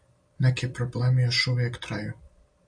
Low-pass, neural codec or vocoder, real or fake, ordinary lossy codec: 9.9 kHz; none; real; MP3, 48 kbps